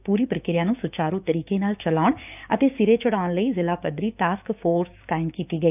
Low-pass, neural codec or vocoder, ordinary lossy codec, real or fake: 3.6 kHz; codec, 16 kHz, 6 kbps, DAC; none; fake